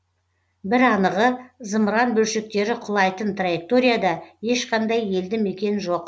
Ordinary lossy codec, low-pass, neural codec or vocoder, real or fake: none; none; none; real